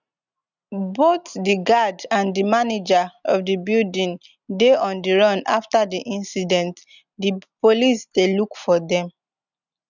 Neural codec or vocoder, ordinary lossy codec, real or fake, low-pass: none; none; real; 7.2 kHz